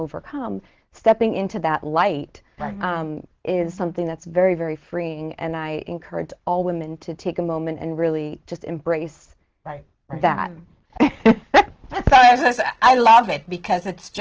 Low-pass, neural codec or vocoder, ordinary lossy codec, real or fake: 7.2 kHz; none; Opus, 16 kbps; real